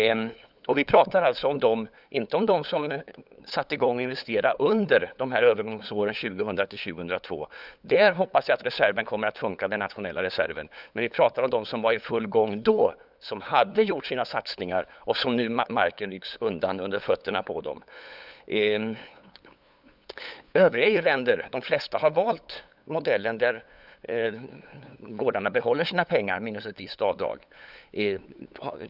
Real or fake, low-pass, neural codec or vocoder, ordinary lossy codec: fake; 5.4 kHz; codec, 16 kHz, 8 kbps, FunCodec, trained on LibriTTS, 25 frames a second; none